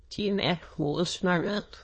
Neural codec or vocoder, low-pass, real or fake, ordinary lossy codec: autoencoder, 22.05 kHz, a latent of 192 numbers a frame, VITS, trained on many speakers; 9.9 kHz; fake; MP3, 32 kbps